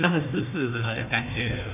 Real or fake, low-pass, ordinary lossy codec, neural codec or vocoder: fake; 3.6 kHz; none; codec, 16 kHz, 1 kbps, FunCodec, trained on Chinese and English, 50 frames a second